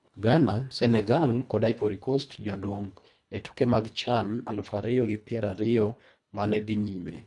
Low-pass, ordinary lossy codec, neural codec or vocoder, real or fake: 10.8 kHz; none; codec, 24 kHz, 1.5 kbps, HILCodec; fake